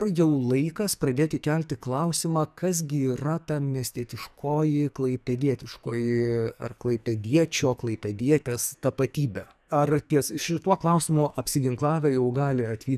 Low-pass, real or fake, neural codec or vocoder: 14.4 kHz; fake; codec, 44.1 kHz, 2.6 kbps, SNAC